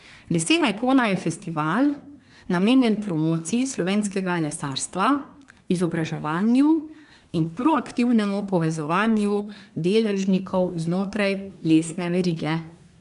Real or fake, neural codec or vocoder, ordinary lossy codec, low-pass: fake; codec, 24 kHz, 1 kbps, SNAC; none; 10.8 kHz